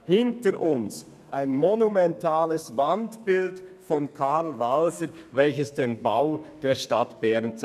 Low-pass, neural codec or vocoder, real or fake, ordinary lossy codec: 14.4 kHz; codec, 32 kHz, 1.9 kbps, SNAC; fake; none